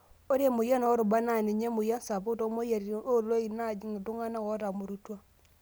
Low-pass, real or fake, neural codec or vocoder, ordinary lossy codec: none; real; none; none